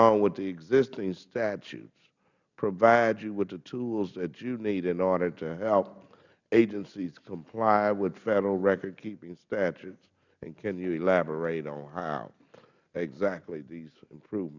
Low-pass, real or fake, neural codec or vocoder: 7.2 kHz; real; none